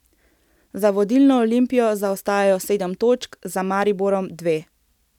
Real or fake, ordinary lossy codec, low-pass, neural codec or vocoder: real; none; 19.8 kHz; none